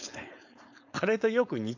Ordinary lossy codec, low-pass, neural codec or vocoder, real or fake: none; 7.2 kHz; codec, 16 kHz, 4.8 kbps, FACodec; fake